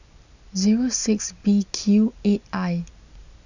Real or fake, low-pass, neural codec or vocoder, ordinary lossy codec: real; 7.2 kHz; none; none